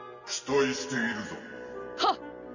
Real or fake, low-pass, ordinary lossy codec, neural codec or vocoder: real; 7.2 kHz; none; none